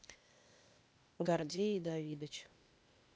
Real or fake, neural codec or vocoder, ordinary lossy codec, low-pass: fake; codec, 16 kHz, 0.8 kbps, ZipCodec; none; none